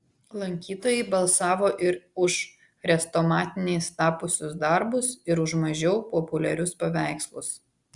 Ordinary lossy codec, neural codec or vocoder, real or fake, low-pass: Opus, 64 kbps; none; real; 10.8 kHz